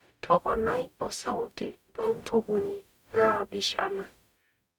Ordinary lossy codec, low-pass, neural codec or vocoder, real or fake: none; 19.8 kHz; codec, 44.1 kHz, 0.9 kbps, DAC; fake